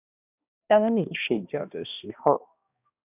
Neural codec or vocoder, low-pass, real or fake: codec, 16 kHz, 1 kbps, X-Codec, HuBERT features, trained on balanced general audio; 3.6 kHz; fake